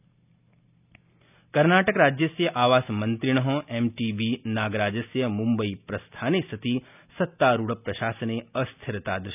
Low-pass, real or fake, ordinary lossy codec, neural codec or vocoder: 3.6 kHz; real; none; none